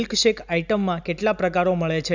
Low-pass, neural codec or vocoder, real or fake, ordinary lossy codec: 7.2 kHz; none; real; none